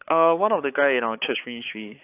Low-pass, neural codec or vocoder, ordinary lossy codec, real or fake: 3.6 kHz; codec, 16 kHz, 4 kbps, X-Codec, HuBERT features, trained on balanced general audio; AAC, 32 kbps; fake